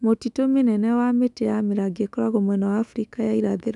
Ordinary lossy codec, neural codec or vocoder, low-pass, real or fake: none; autoencoder, 48 kHz, 128 numbers a frame, DAC-VAE, trained on Japanese speech; 10.8 kHz; fake